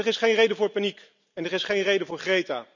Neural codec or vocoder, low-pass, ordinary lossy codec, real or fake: none; 7.2 kHz; none; real